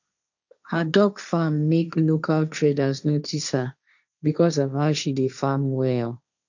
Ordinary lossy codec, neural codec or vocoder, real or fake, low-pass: none; codec, 16 kHz, 1.1 kbps, Voila-Tokenizer; fake; 7.2 kHz